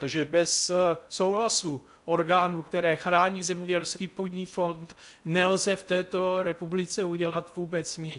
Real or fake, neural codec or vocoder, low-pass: fake; codec, 16 kHz in and 24 kHz out, 0.6 kbps, FocalCodec, streaming, 4096 codes; 10.8 kHz